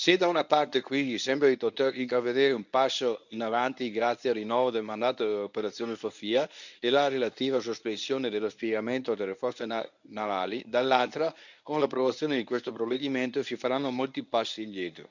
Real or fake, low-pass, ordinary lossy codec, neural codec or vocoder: fake; 7.2 kHz; none; codec, 24 kHz, 0.9 kbps, WavTokenizer, medium speech release version 1